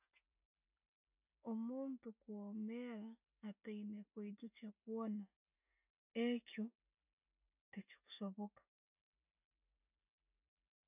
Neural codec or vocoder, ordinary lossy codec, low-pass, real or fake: none; none; 3.6 kHz; real